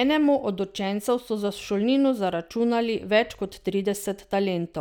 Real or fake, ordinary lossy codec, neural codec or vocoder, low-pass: real; none; none; 19.8 kHz